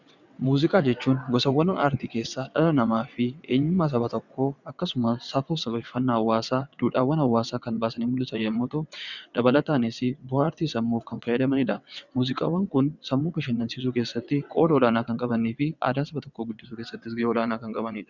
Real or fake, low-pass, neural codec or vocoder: fake; 7.2 kHz; vocoder, 22.05 kHz, 80 mel bands, WaveNeXt